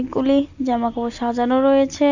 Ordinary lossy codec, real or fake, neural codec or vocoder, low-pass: Opus, 64 kbps; real; none; 7.2 kHz